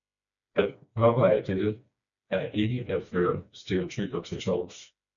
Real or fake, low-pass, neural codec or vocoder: fake; 7.2 kHz; codec, 16 kHz, 2 kbps, FreqCodec, smaller model